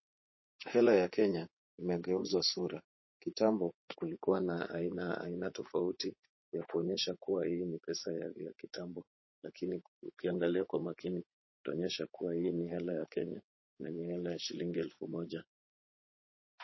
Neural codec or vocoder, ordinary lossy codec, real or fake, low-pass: vocoder, 44.1 kHz, 80 mel bands, Vocos; MP3, 24 kbps; fake; 7.2 kHz